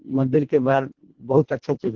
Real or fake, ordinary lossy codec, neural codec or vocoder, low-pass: fake; Opus, 16 kbps; codec, 24 kHz, 1.5 kbps, HILCodec; 7.2 kHz